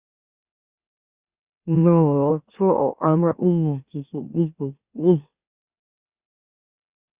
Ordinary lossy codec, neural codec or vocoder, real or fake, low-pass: Opus, 64 kbps; autoencoder, 44.1 kHz, a latent of 192 numbers a frame, MeloTTS; fake; 3.6 kHz